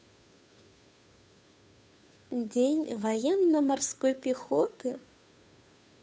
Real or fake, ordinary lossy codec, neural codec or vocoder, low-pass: fake; none; codec, 16 kHz, 2 kbps, FunCodec, trained on Chinese and English, 25 frames a second; none